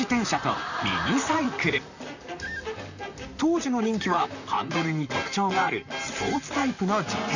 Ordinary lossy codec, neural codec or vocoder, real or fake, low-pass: none; vocoder, 44.1 kHz, 128 mel bands, Pupu-Vocoder; fake; 7.2 kHz